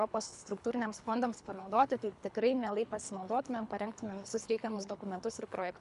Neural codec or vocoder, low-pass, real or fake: codec, 24 kHz, 3 kbps, HILCodec; 10.8 kHz; fake